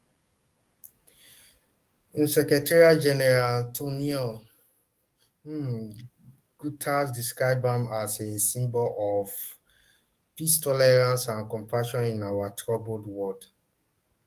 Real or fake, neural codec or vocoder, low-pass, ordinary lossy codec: fake; codec, 44.1 kHz, 7.8 kbps, DAC; 14.4 kHz; Opus, 32 kbps